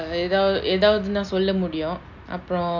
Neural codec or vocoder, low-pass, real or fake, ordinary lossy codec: none; 7.2 kHz; real; none